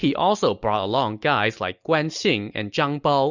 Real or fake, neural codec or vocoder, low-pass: real; none; 7.2 kHz